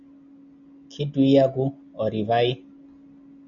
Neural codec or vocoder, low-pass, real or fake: none; 7.2 kHz; real